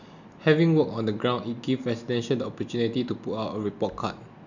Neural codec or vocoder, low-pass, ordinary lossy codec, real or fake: none; 7.2 kHz; none; real